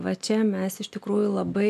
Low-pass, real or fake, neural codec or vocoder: 14.4 kHz; real; none